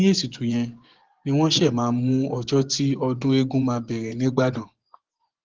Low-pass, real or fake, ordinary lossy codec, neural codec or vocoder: 7.2 kHz; fake; Opus, 16 kbps; vocoder, 24 kHz, 100 mel bands, Vocos